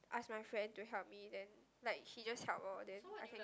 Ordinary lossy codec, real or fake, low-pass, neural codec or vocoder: none; real; none; none